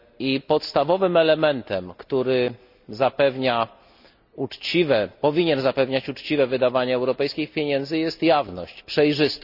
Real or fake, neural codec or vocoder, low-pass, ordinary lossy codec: real; none; 5.4 kHz; none